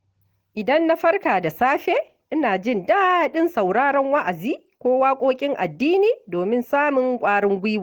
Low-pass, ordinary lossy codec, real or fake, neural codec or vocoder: 19.8 kHz; Opus, 16 kbps; real; none